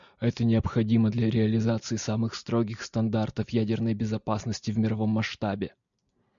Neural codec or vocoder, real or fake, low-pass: none; real; 7.2 kHz